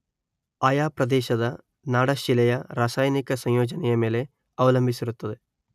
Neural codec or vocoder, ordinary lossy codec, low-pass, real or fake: none; none; 14.4 kHz; real